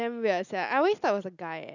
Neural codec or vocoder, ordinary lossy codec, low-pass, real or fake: none; none; 7.2 kHz; real